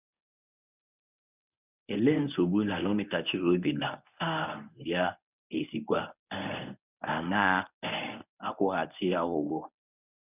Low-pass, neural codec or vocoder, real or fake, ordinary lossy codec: 3.6 kHz; codec, 24 kHz, 0.9 kbps, WavTokenizer, medium speech release version 1; fake; none